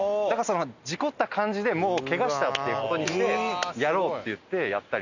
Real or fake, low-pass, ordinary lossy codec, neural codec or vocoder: real; 7.2 kHz; none; none